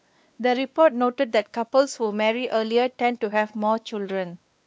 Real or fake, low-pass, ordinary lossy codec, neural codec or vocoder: fake; none; none; codec, 16 kHz, 2 kbps, X-Codec, WavLM features, trained on Multilingual LibriSpeech